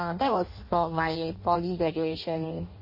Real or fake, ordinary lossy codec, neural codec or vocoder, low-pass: fake; MP3, 24 kbps; codec, 16 kHz in and 24 kHz out, 0.6 kbps, FireRedTTS-2 codec; 5.4 kHz